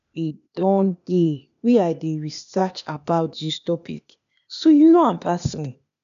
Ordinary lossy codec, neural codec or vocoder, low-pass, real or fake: none; codec, 16 kHz, 0.8 kbps, ZipCodec; 7.2 kHz; fake